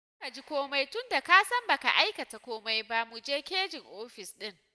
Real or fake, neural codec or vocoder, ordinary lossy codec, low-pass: real; none; none; none